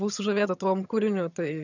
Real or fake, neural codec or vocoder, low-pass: fake; vocoder, 22.05 kHz, 80 mel bands, HiFi-GAN; 7.2 kHz